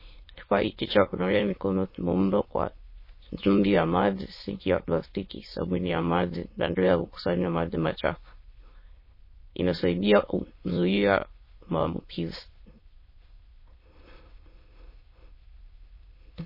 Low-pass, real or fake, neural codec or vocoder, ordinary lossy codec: 5.4 kHz; fake; autoencoder, 22.05 kHz, a latent of 192 numbers a frame, VITS, trained on many speakers; MP3, 24 kbps